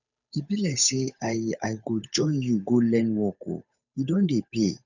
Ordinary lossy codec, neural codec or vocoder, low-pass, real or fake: none; codec, 16 kHz, 8 kbps, FunCodec, trained on Chinese and English, 25 frames a second; 7.2 kHz; fake